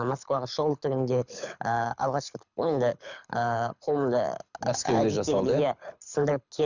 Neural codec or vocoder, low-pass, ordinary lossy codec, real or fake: codec, 24 kHz, 6 kbps, HILCodec; 7.2 kHz; none; fake